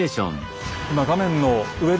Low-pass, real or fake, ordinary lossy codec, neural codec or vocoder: none; real; none; none